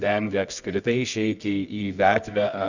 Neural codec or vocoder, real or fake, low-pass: codec, 24 kHz, 0.9 kbps, WavTokenizer, medium music audio release; fake; 7.2 kHz